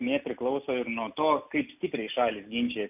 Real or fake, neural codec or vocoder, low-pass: real; none; 3.6 kHz